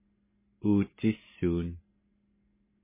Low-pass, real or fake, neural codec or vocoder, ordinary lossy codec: 3.6 kHz; real; none; MP3, 16 kbps